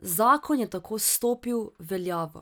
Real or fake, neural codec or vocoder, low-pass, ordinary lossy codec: real; none; none; none